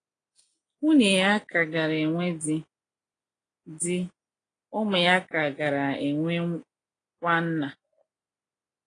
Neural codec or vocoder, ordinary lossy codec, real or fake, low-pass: none; AAC, 32 kbps; real; 9.9 kHz